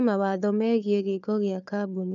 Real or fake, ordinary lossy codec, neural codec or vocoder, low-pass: fake; none; codec, 16 kHz, 4 kbps, FunCodec, trained on Chinese and English, 50 frames a second; 7.2 kHz